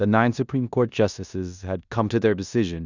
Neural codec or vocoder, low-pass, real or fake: codec, 16 kHz in and 24 kHz out, 0.9 kbps, LongCat-Audio-Codec, four codebook decoder; 7.2 kHz; fake